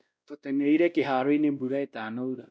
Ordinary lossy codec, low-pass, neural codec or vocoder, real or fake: none; none; codec, 16 kHz, 1 kbps, X-Codec, WavLM features, trained on Multilingual LibriSpeech; fake